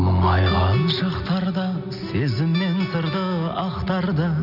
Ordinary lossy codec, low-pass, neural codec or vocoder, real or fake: none; 5.4 kHz; none; real